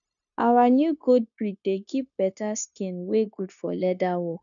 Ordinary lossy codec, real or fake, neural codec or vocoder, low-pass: none; fake; codec, 16 kHz, 0.9 kbps, LongCat-Audio-Codec; 7.2 kHz